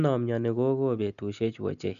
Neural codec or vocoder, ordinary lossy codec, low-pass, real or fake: none; none; 7.2 kHz; real